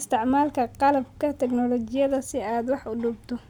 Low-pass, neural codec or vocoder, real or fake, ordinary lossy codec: 19.8 kHz; none; real; none